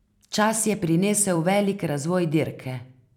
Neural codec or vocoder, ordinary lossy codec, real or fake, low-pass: vocoder, 44.1 kHz, 128 mel bands every 256 samples, BigVGAN v2; none; fake; 19.8 kHz